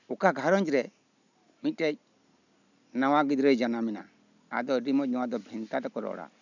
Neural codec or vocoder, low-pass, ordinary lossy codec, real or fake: none; 7.2 kHz; none; real